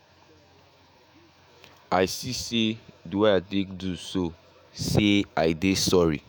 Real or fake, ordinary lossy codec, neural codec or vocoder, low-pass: fake; none; autoencoder, 48 kHz, 128 numbers a frame, DAC-VAE, trained on Japanese speech; none